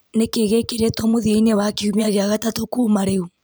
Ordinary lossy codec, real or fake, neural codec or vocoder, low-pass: none; fake; vocoder, 44.1 kHz, 128 mel bands every 512 samples, BigVGAN v2; none